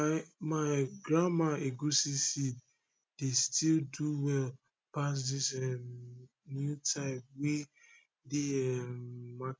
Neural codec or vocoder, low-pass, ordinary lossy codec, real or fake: none; none; none; real